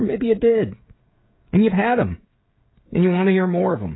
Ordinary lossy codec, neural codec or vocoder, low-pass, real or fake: AAC, 16 kbps; codec, 16 kHz, 16 kbps, FreqCodec, smaller model; 7.2 kHz; fake